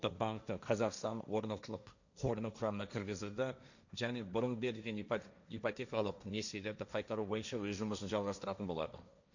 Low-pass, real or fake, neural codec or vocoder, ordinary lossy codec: 7.2 kHz; fake; codec, 16 kHz, 1.1 kbps, Voila-Tokenizer; none